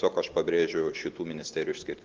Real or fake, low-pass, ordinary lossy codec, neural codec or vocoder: real; 7.2 kHz; Opus, 16 kbps; none